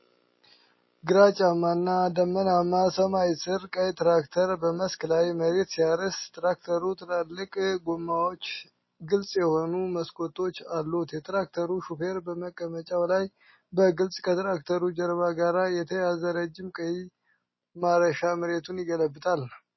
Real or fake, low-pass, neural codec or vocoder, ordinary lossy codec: real; 7.2 kHz; none; MP3, 24 kbps